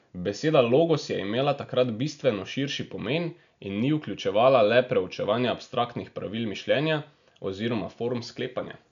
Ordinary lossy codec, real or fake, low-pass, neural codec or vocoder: none; real; 7.2 kHz; none